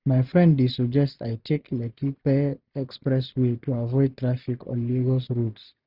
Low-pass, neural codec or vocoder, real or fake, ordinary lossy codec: 5.4 kHz; none; real; none